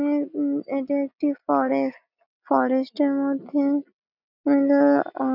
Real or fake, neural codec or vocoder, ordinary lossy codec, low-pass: real; none; none; 5.4 kHz